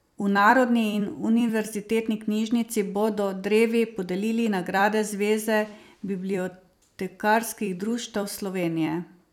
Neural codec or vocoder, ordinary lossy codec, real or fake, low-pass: vocoder, 44.1 kHz, 128 mel bands every 256 samples, BigVGAN v2; none; fake; 19.8 kHz